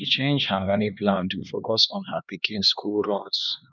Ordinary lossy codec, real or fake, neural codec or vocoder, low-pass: none; fake; codec, 16 kHz, 2 kbps, X-Codec, HuBERT features, trained on LibriSpeech; 7.2 kHz